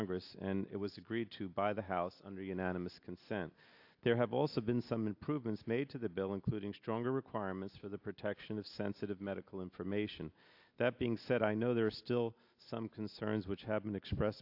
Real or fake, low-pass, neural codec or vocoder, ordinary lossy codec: real; 5.4 kHz; none; AAC, 48 kbps